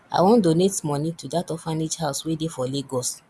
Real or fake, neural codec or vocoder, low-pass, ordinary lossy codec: real; none; none; none